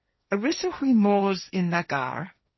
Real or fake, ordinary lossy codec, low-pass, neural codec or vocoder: fake; MP3, 24 kbps; 7.2 kHz; codec, 16 kHz, 1.1 kbps, Voila-Tokenizer